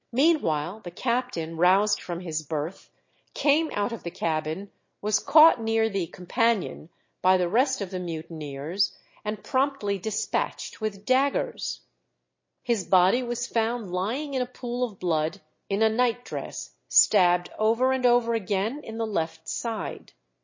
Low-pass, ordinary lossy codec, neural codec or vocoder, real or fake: 7.2 kHz; MP3, 32 kbps; none; real